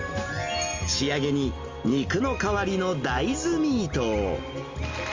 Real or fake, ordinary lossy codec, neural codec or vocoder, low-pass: real; Opus, 32 kbps; none; 7.2 kHz